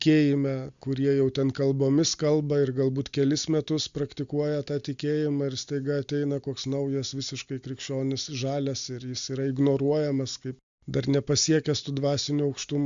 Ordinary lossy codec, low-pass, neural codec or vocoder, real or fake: Opus, 64 kbps; 7.2 kHz; none; real